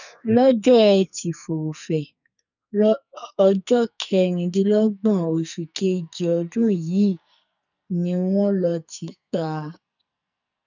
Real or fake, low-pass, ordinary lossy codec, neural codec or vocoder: fake; 7.2 kHz; none; codec, 44.1 kHz, 2.6 kbps, SNAC